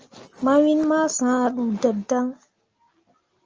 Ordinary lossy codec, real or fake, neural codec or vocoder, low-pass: Opus, 24 kbps; real; none; 7.2 kHz